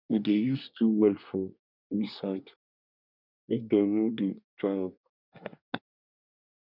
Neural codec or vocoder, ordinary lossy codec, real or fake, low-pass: codec, 24 kHz, 1 kbps, SNAC; none; fake; 5.4 kHz